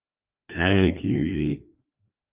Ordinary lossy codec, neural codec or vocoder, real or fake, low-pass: Opus, 32 kbps; codec, 16 kHz, 2 kbps, FreqCodec, larger model; fake; 3.6 kHz